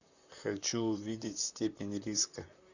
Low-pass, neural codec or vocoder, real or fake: 7.2 kHz; vocoder, 44.1 kHz, 128 mel bands, Pupu-Vocoder; fake